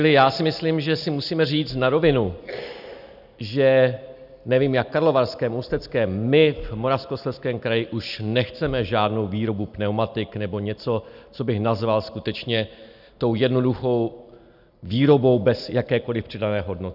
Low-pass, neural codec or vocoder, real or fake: 5.4 kHz; none; real